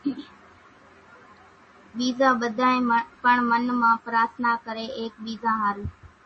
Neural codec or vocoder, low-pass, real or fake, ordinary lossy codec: none; 10.8 kHz; real; MP3, 32 kbps